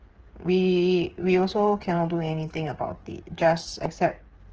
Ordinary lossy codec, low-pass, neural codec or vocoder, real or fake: Opus, 16 kbps; 7.2 kHz; codec, 16 kHz, 8 kbps, FreqCodec, smaller model; fake